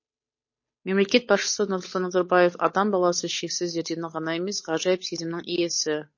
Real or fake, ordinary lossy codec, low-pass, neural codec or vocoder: fake; MP3, 32 kbps; 7.2 kHz; codec, 16 kHz, 8 kbps, FunCodec, trained on Chinese and English, 25 frames a second